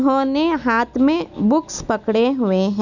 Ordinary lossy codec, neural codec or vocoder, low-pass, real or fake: none; none; 7.2 kHz; real